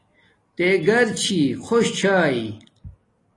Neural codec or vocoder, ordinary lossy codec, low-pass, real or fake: none; AAC, 32 kbps; 10.8 kHz; real